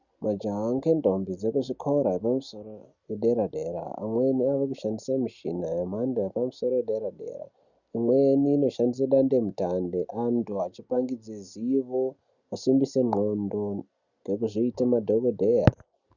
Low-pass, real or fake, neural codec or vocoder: 7.2 kHz; real; none